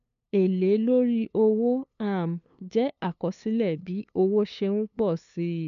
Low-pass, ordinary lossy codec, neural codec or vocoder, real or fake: 7.2 kHz; none; codec, 16 kHz, 2 kbps, FunCodec, trained on LibriTTS, 25 frames a second; fake